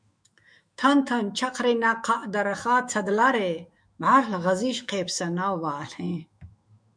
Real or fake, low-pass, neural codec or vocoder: fake; 9.9 kHz; autoencoder, 48 kHz, 128 numbers a frame, DAC-VAE, trained on Japanese speech